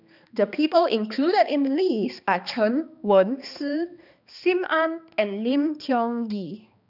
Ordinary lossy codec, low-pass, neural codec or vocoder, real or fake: none; 5.4 kHz; codec, 16 kHz, 2 kbps, X-Codec, HuBERT features, trained on balanced general audio; fake